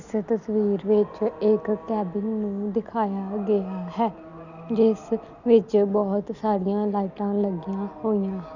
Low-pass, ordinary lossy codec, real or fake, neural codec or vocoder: 7.2 kHz; none; real; none